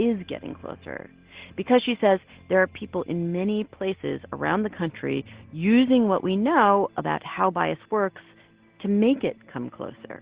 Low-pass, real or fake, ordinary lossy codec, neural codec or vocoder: 3.6 kHz; real; Opus, 16 kbps; none